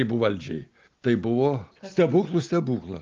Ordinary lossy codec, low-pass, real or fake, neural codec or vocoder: Opus, 24 kbps; 7.2 kHz; real; none